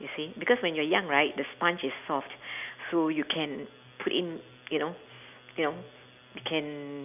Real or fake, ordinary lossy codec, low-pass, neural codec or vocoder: real; none; 3.6 kHz; none